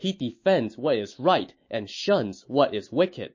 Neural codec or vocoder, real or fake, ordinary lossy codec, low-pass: autoencoder, 48 kHz, 128 numbers a frame, DAC-VAE, trained on Japanese speech; fake; MP3, 32 kbps; 7.2 kHz